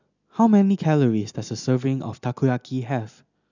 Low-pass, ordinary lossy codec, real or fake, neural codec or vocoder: 7.2 kHz; none; real; none